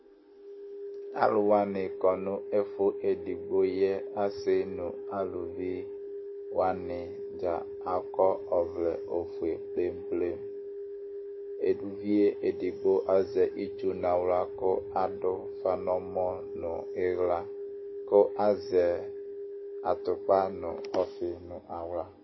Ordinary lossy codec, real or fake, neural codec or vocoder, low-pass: MP3, 24 kbps; real; none; 7.2 kHz